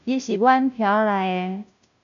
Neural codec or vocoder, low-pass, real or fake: codec, 16 kHz, 0.5 kbps, FunCodec, trained on Chinese and English, 25 frames a second; 7.2 kHz; fake